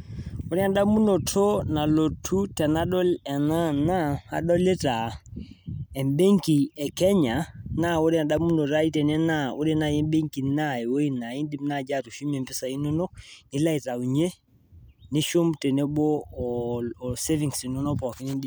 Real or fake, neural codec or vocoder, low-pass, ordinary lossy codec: real; none; none; none